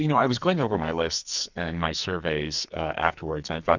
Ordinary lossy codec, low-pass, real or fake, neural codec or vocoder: Opus, 64 kbps; 7.2 kHz; fake; codec, 44.1 kHz, 2.6 kbps, SNAC